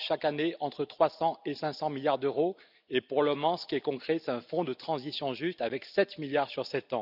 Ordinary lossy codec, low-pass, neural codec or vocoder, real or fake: none; 5.4 kHz; none; real